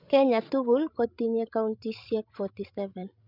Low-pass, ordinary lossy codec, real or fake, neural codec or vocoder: 5.4 kHz; none; fake; codec, 16 kHz, 16 kbps, FreqCodec, larger model